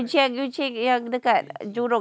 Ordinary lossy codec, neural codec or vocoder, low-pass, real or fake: none; none; none; real